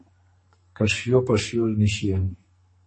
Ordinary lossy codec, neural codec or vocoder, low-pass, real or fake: MP3, 32 kbps; codec, 44.1 kHz, 2.6 kbps, SNAC; 9.9 kHz; fake